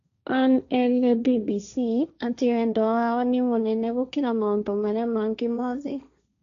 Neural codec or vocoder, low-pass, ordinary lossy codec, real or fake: codec, 16 kHz, 1.1 kbps, Voila-Tokenizer; 7.2 kHz; none; fake